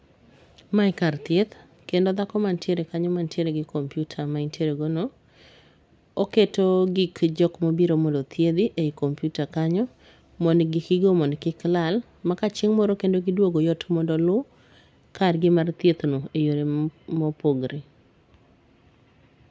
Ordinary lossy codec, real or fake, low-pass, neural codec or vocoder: none; real; none; none